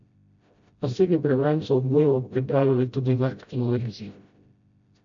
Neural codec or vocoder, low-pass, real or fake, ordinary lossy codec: codec, 16 kHz, 0.5 kbps, FreqCodec, smaller model; 7.2 kHz; fake; MP3, 48 kbps